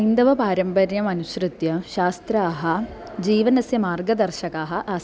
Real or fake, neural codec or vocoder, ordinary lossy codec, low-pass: real; none; none; none